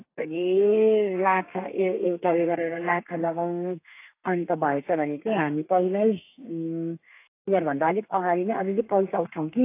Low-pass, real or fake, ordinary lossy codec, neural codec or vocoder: 3.6 kHz; fake; AAC, 24 kbps; codec, 32 kHz, 1.9 kbps, SNAC